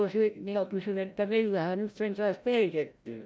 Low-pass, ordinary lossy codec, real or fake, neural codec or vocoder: none; none; fake; codec, 16 kHz, 0.5 kbps, FreqCodec, larger model